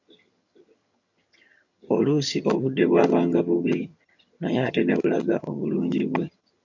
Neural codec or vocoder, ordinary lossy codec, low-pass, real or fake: vocoder, 22.05 kHz, 80 mel bands, HiFi-GAN; MP3, 48 kbps; 7.2 kHz; fake